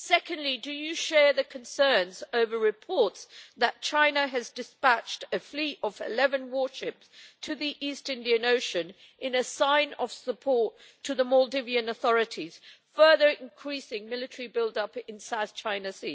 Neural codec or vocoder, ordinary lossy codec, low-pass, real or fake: none; none; none; real